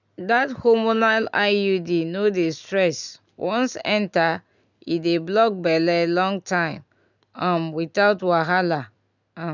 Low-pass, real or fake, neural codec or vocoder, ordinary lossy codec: 7.2 kHz; real; none; none